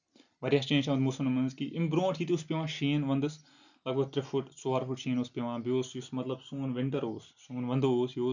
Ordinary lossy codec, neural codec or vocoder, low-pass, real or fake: none; none; 7.2 kHz; real